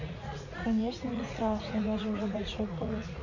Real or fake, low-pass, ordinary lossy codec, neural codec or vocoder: fake; 7.2 kHz; none; vocoder, 44.1 kHz, 80 mel bands, Vocos